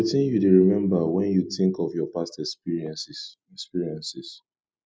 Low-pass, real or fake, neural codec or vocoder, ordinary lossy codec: none; real; none; none